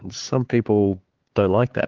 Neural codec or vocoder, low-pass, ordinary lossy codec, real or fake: codec, 16 kHz, 4 kbps, X-Codec, HuBERT features, trained on LibriSpeech; 7.2 kHz; Opus, 16 kbps; fake